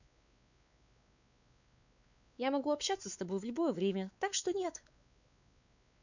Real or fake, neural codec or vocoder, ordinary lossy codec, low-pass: fake; codec, 16 kHz, 4 kbps, X-Codec, WavLM features, trained on Multilingual LibriSpeech; none; 7.2 kHz